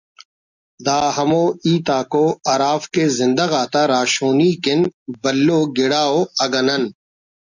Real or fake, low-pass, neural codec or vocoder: real; 7.2 kHz; none